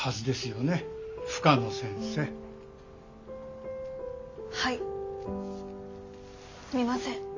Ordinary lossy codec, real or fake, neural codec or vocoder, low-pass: MP3, 64 kbps; real; none; 7.2 kHz